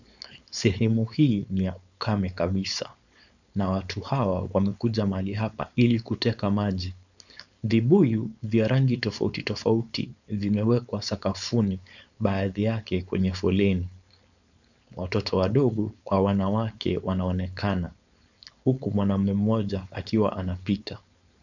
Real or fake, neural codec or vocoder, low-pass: fake; codec, 16 kHz, 4.8 kbps, FACodec; 7.2 kHz